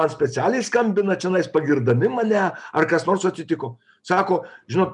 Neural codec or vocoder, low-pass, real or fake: none; 10.8 kHz; real